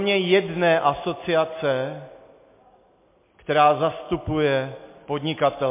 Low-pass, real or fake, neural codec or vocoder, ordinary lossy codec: 3.6 kHz; real; none; MP3, 24 kbps